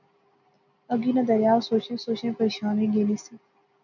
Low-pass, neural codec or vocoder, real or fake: 7.2 kHz; none; real